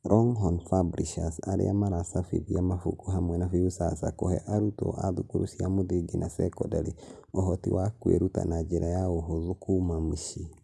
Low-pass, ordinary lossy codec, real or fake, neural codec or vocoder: none; none; real; none